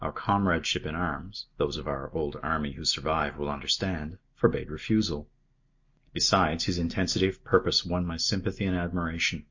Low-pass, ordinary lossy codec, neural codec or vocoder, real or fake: 7.2 kHz; MP3, 48 kbps; none; real